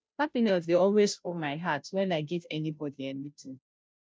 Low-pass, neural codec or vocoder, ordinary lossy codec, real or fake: none; codec, 16 kHz, 0.5 kbps, FunCodec, trained on Chinese and English, 25 frames a second; none; fake